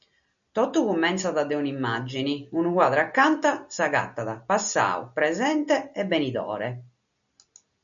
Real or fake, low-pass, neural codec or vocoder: real; 7.2 kHz; none